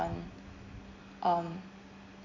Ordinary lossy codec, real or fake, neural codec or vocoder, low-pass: Opus, 64 kbps; real; none; 7.2 kHz